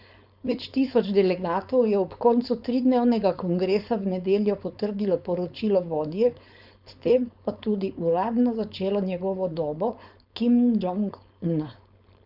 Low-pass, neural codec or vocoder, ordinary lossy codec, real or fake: 5.4 kHz; codec, 16 kHz, 4.8 kbps, FACodec; none; fake